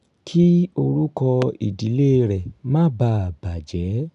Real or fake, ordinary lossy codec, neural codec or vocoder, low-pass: real; none; none; 10.8 kHz